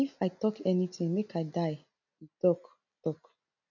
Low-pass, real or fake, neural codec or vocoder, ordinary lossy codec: 7.2 kHz; real; none; none